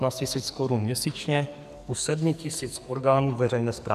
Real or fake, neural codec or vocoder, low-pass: fake; codec, 44.1 kHz, 2.6 kbps, SNAC; 14.4 kHz